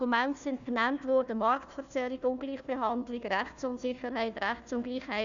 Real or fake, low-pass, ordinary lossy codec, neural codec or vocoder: fake; 7.2 kHz; none; codec, 16 kHz, 1 kbps, FunCodec, trained on Chinese and English, 50 frames a second